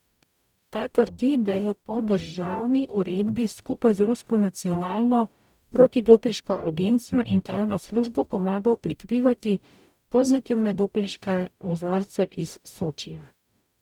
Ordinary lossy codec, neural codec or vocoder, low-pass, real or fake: none; codec, 44.1 kHz, 0.9 kbps, DAC; 19.8 kHz; fake